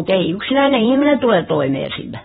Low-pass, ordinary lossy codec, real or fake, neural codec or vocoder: 19.8 kHz; AAC, 16 kbps; fake; vocoder, 48 kHz, 128 mel bands, Vocos